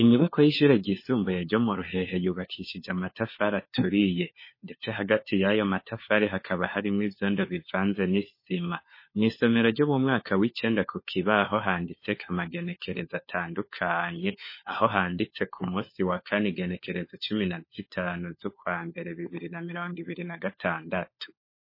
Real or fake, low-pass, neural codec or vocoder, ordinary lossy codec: fake; 5.4 kHz; codec, 16 kHz, 4 kbps, FunCodec, trained on LibriTTS, 50 frames a second; MP3, 24 kbps